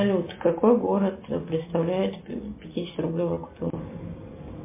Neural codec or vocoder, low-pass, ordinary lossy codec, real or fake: none; 3.6 kHz; MP3, 24 kbps; real